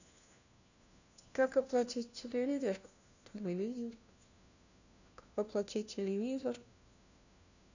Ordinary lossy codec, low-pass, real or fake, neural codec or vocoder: none; 7.2 kHz; fake; codec, 16 kHz, 1 kbps, FunCodec, trained on LibriTTS, 50 frames a second